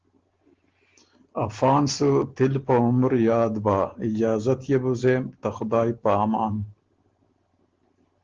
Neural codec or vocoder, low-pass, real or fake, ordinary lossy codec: none; 7.2 kHz; real; Opus, 16 kbps